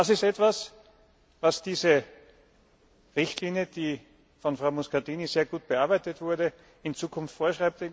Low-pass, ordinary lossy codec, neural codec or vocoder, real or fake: none; none; none; real